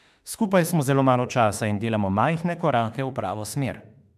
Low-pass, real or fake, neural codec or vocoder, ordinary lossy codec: 14.4 kHz; fake; autoencoder, 48 kHz, 32 numbers a frame, DAC-VAE, trained on Japanese speech; MP3, 96 kbps